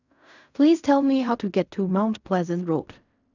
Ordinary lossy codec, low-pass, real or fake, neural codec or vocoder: none; 7.2 kHz; fake; codec, 16 kHz in and 24 kHz out, 0.4 kbps, LongCat-Audio-Codec, fine tuned four codebook decoder